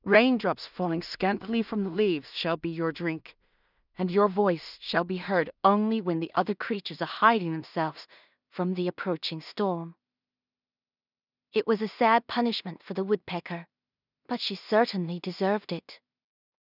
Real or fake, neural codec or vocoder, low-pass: fake; codec, 16 kHz in and 24 kHz out, 0.4 kbps, LongCat-Audio-Codec, two codebook decoder; 5.4 kHz